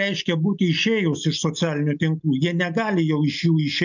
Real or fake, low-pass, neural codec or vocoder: real; 7.2 kHz; none